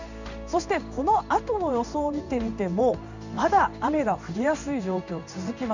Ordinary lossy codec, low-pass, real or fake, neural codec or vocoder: none; 7.2 kHz; fake; codec, 16 kHz in and 24 kHz out, 1 kbps, XY-Tokenizer